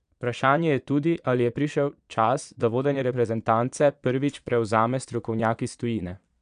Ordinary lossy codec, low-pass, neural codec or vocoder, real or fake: none; 9.9 kHz; vocoder, 22.05 kHz, 80 mel bands, Vocos; fake